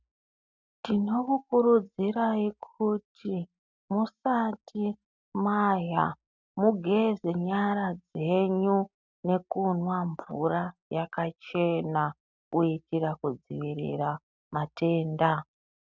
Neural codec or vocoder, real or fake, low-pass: none; real; 7.2 kHz